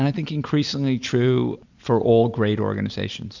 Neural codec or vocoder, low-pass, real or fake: none; 7.2 kHz; real